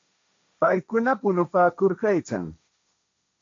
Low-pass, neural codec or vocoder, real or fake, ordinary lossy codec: 7.2 kHz; codec, 16 kHz, 1.1 kbps, Voila-Tokenizer; fake; AAC, 48 kbps